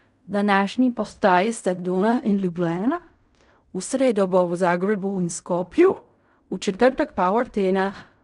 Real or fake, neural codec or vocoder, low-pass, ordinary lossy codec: fake; codec, 16 kHz in and 24 kHz out, 0.4 kbps, LongCat-Audio-Codec, fine tuned four codebook decoder; 10.8 kHz; none